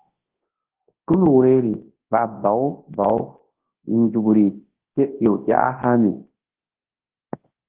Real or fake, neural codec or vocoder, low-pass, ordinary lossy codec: fake; codec, 24 kHz, 0.9 kbps, WavTokenizer, large speech release; 3.6 kHz; Opus, 16 kbps